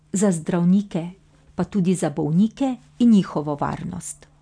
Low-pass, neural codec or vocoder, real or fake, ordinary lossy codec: 9.9 kHz; none; real; none